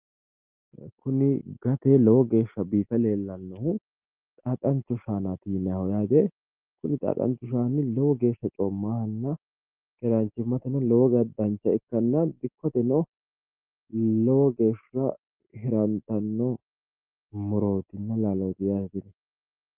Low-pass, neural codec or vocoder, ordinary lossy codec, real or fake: 3.6 kHz; none; Opus, 32 kbps; real